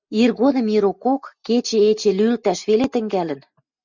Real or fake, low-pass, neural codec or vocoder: real; 7.2 kHz; none